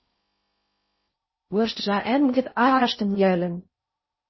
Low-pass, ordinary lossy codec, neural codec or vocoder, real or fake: 7.2 kHz; MP3, 24 kbps; codec, 16 kHz in and 24 kHz out, 0.6 kbps, FocalCodec, streaming, 4096 codes; fake